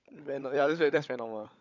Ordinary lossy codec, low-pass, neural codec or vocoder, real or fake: none; 7.2 kHz; codec, 16 kHz, 16 kbps, FunCodec, trained on LibriTTS, 50 frames a second; fake